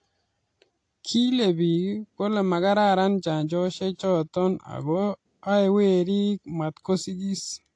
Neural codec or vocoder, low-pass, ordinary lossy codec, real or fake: none; 9.9 kHz; AAC, 48 kbps; real